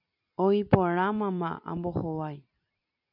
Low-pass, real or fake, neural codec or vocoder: 5.4 kHz; fake; vocoder, 44.1 kHz, 128 mel bands every 256 samples, BigVGAN v2